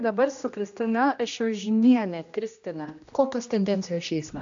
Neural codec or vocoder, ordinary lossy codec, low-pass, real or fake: codec, 16 kHz, 1 kbps, X-Codec, HuBERT features, trained on general audio; AAC, 64 kbps; 7.2 kHz; fake